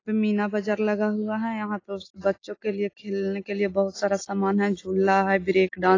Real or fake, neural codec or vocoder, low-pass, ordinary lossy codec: real; none; 7.2 kHz; AAC, 32 kbps